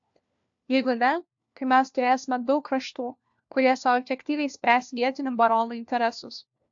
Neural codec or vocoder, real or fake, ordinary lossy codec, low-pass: codec, 16 kHz, 1 kbps, FunCodec, trained on LibriTTS, 50 frames a second; fake; AAC, 64 kbps; 7.2 kHz